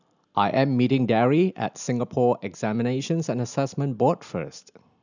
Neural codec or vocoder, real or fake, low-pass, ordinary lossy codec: none; real; 7.2 kHz; none